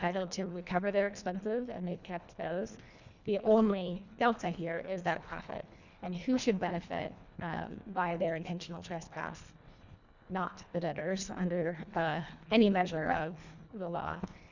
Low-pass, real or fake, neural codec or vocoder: 7.2 kHz; fake; codec, 24 kHz, 1.5 kbps, HILCodec